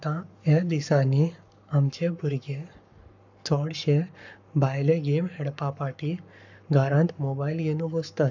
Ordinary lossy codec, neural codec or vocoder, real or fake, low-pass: none; codec, 44.1 kHz, 7.8 kbps, Pupu-Codec; fake; 7.2 kHz